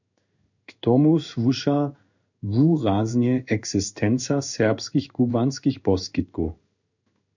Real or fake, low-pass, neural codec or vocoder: fake; 7.2 kHz; codec, 16 kHz in and 24 kHz out, 1 kbps, XY-Tokenizer